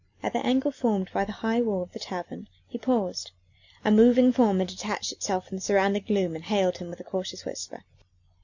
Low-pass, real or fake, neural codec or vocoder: 7.2 kHz; real; none